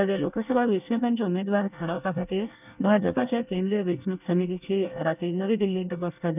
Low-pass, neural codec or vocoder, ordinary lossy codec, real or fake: 3.6 kHz; codec, 24 kHz, 1 kbps, SNAC; none; fake